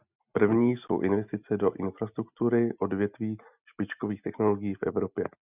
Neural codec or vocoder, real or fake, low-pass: none; real; 3.6 kHz